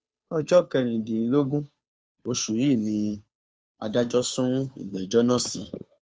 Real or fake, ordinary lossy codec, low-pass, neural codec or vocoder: fake; none; none; codec, 16 kHz, 2 kbps, FunCodec, trained on Chinese and English, 25 frames a second